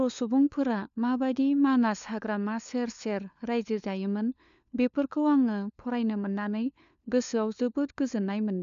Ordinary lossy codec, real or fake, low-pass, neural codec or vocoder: none; fake; 7.2 kHz; codec, 16 kHz, 4 kbps, FunCodec, trained on LibriTTS, 50 frames a second